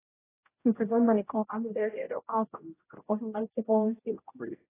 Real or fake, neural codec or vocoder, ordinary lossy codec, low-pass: fake; codec, 16 kHz, 0.5 kbps, X-Codec, HuBERT features, trained on general audio; AAC, 16 kbps; 3.6 kHz